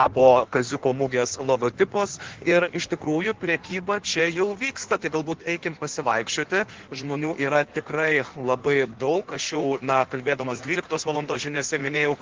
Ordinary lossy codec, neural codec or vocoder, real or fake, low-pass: Opus, 16 kbps; codec, 16 kHz in and 24 kHz out, 1.1 kbps, FireRedTTS-2 codec; fake; 7.2 kHz